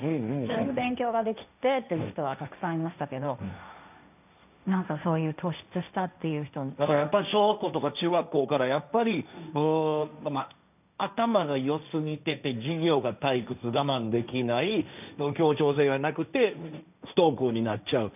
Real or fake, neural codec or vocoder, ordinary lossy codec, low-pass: fake; codec, 16 kHz, 1.1 kbps, Voila-Tokenizer; none; 3.6 kHz